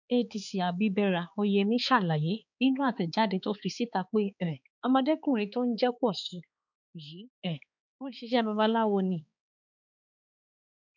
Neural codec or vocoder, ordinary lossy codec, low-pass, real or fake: codec, 16 kHz, 4 kbps, X-Codec, HuBERT features, trained on balanced general audio; none; 7.2 kHz; fake